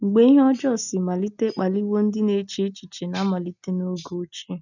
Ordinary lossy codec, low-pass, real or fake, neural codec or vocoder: none; 7.2 kHz; real; none